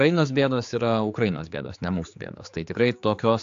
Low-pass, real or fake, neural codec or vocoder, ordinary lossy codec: 7.2 kHz; fake; codec, 16 kHz, 4 kbps, X-Codec, HuBERT features, trained on general audio; AAC, 64 kbps